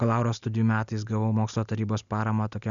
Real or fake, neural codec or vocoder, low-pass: real; none; 7.2 kHz